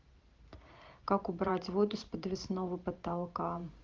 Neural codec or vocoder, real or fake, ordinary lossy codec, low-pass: vocoder, 44.1 kHz, 128 mel bands every 512 samples, BigVGAN v2; fake; Opus, 32 kbps; 7.2 kHz